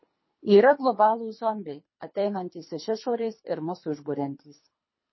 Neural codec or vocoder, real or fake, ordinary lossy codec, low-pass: codec, 24 kHz, 3 kbps, HILCodec; fake; MP3, 24 kbps; 7.2 kHz